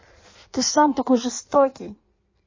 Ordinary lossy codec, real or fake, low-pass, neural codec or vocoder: MP3, 32 kbps; fake; 7.2 kHz; codec, 16 kHz in and 24 kHz out, 1.1 kbps, FireRedTTS-2 codec